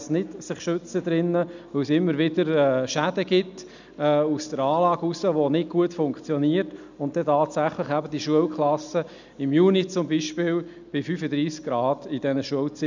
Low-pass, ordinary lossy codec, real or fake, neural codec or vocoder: 7.2 kHz; none; real; none